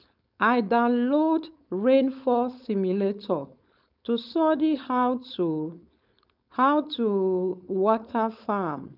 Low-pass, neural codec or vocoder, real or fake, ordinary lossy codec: 5.4 kHz; codec, 16 kHz, 4.8 kbps, FACodec; fake; none